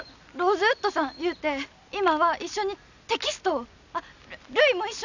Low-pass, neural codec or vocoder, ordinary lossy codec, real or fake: 7.2 kHz; none; none; real